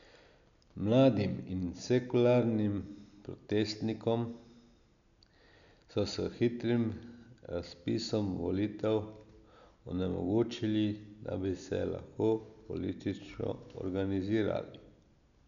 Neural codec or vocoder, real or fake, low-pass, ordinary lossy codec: none; real; 7.2 kHz; none